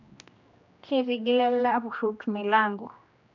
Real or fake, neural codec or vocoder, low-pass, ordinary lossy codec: fake; codec, 16 kHz, 1 kbps, X-Codec, HuBERT features, trained on general audio; 7.2 kHz; none